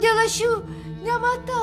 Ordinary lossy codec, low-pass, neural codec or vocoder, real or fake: Opus, 64 kbps; 14.4 kHz; none; real